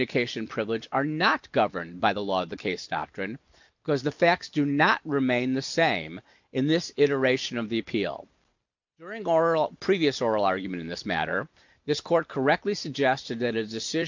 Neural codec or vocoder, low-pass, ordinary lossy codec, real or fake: none; 7.2 kHz; MP3, 64 kbps; real